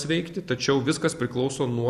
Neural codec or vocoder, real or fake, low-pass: none; real; 14.4 kHz